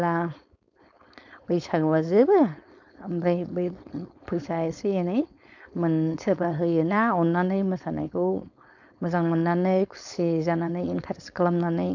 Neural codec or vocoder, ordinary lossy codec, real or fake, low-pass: codec, 16 kHz, 4.8 kbps, FACodec; none; fake; 7.2 kHz